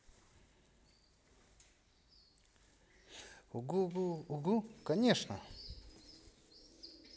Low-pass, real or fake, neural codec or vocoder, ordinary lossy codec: none; real; none; none